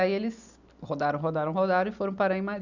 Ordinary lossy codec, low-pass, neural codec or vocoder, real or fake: none; 7.2 kHz; none; real